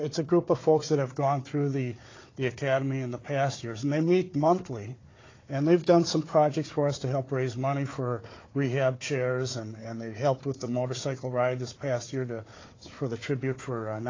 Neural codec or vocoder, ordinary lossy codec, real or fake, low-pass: codec, 16 kHz, 4 kbps, FunCodec, trained on Chinese and English, 50 frames a second; AAC, 32 kbps; fake; 7.2 kHz